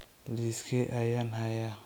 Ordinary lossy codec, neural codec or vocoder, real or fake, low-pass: none; none; real; none